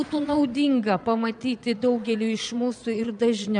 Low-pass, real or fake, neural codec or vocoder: 9.9 kHz; fake; vocoder, 22.05 kHz, 80 mel bands, Vocos